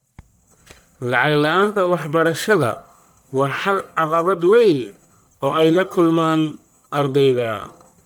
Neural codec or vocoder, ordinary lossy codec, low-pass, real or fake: codec, 44.1 kHz, 1.7 kbps, Pupu-Codec; none; none; fake